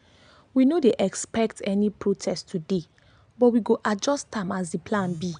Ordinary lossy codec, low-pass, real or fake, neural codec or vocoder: MP3, 96 kbps; 9.9 kHz; real; none